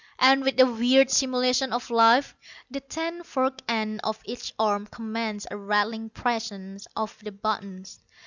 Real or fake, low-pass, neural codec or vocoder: real; 7.2 kHz; none